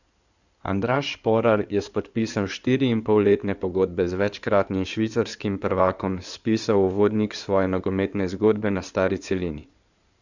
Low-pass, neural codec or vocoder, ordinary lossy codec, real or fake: 7.2 kHz; codec, 16 kHz in and 24 kHz out, 2.2 kbps, FireRedTTS-2 codec; none; fake